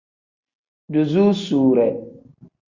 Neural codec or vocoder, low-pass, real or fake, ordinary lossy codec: none; 7.2 kHz; real; Opus, 64 kbps